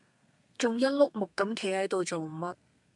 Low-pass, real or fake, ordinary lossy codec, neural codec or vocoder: 10.8 kHz; fake; MP3, 96 kbps; codec, 32 kHz, 1.9 kbps, SNAC